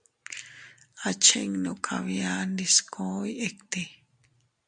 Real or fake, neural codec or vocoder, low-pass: real; none; 9.9 kHz